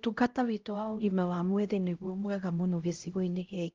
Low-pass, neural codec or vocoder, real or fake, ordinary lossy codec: 7.2 kHz; codec, 16 kHz, 0.5 kbps, X-Codec, HuBERT features, trained on LibriSpeech; fake; Opus, 32 kbps